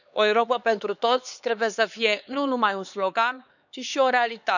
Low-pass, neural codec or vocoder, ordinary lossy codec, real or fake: 7.2 kHz; codec, 16 kHz, 4 kbps, X-Codec, HuBERT features, trained on LibriSpeech; none; fake